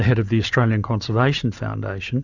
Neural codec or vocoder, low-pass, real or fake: none; 7.2 kHz; real